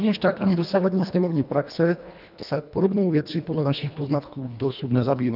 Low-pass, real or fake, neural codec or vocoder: 5.4 kHz; fake; codec, 24 kHz, 1.5 kbps, HILCodec